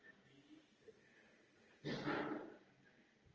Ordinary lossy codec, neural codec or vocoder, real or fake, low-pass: Opus, 24 kbps; none; real; 7.2 kHz